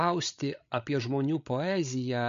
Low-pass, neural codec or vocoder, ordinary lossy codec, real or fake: 7.2 kHz; codec, 16 kHz, 16 kbps, FreqCodec, larger model; MP3, 48 kbps; fake